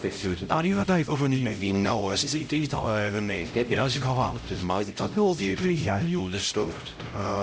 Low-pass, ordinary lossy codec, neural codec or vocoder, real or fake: none; none; codec, 16 kHz, 0.5 kbps, X-Codec, HuBERT features, trained on LibriSpeech; fake